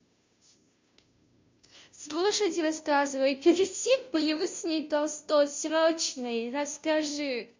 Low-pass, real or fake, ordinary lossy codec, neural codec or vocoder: 7.2 kHz; fake; MP3, 64 kbps; codec, 16 kHz, 0.5 kbps, FunCodec, trained on Chinese and English, 25 frames a second